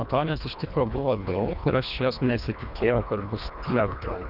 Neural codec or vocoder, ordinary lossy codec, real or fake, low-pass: codec, 24 kHz, 1.5 kbps, HILCodec; Opus, 64 kbps; fake; 5.4 kHz